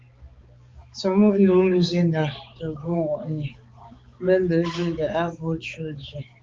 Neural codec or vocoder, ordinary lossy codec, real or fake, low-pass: codec, 16 kHz, 4 kbps, X-Codec, HuBERT features, trained on balanced general audio; Opus, 32 kbps; fake; 7.2 kHz